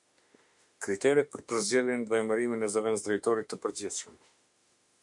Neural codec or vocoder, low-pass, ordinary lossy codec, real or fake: autoencoder, 48 kHz, 32 numbers a frame, DAC-VAE, trained on Japanese speech; 10.8 kHz; MP3, 64 kbps; fake